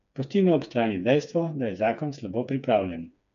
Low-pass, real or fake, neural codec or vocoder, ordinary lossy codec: 7.2 kHz; fake; codec, 16 kHz, 4 kbps, FreqCodec, smaller model; none